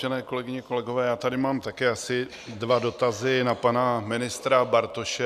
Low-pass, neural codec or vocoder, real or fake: 14.4 kHz; none; real